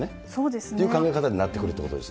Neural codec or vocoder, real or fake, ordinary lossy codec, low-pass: none; real; none; none